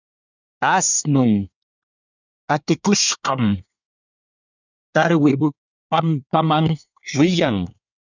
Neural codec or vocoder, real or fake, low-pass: codec, 24 kHz, 1 kbps, SNAC; fake; 7.2 kHz